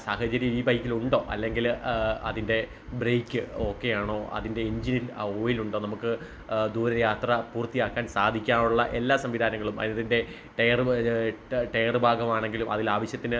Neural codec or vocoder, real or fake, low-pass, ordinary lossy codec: none; real; none; none